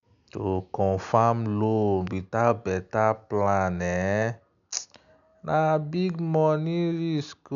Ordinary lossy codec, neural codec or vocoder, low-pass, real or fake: none; none; 7.2 kHz; real